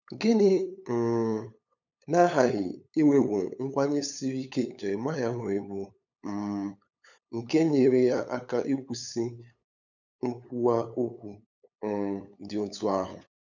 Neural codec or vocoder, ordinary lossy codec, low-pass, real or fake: codec, 16 kHz, 8 kbps, FunCodec, trained on LibriTTS, 25 frames a second; none; 7.2 kHz; fake